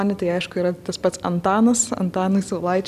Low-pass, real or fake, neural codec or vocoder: 14.4 kHz; real; none